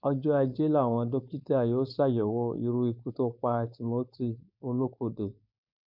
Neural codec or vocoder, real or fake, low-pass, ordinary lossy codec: codec, 16 kHz, 4.8 kbps, FACodec; fake; 5.4 kHz; none